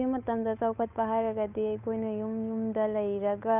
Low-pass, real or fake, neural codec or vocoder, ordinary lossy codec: 3.6 kHz; fake; codec, 16 kHz, 8 kbps, FunCodec, trained on Chinese and English, 25 frames a second; none